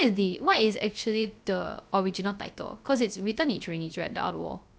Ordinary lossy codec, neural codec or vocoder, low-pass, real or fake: none; codec, 16 kHz, 0.3 kbps, FocalCodec; none; fake